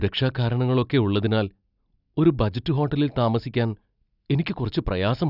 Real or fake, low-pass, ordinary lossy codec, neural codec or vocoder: real; 5.4 kHz; none; none